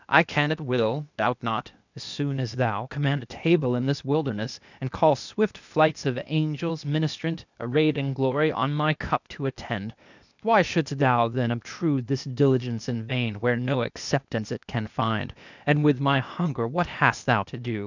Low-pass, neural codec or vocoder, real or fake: 7.2 kHz; codec, 16 kHz, 0.8 kbps, ZipCodec; fake